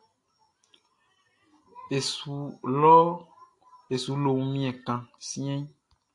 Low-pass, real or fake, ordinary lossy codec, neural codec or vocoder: 10.8 kHz; real; AAC, 48 kbps; none